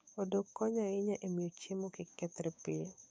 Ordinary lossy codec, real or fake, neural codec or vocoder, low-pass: none; fake; codec, 16 kHz, 6 kbps, DAC; none